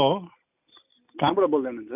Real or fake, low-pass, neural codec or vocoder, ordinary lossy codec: real; 3.6 kHz; none; none